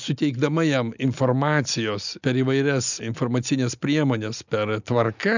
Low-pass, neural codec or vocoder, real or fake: 7.2 kHz; none; real